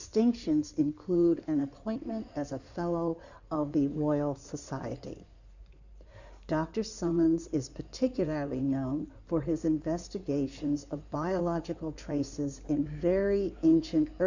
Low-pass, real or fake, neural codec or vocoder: 7.2 kHz; fake; codec, 16 kHz in and 24 kHz out, 2.2 kbps, FireRedTTS-2 codec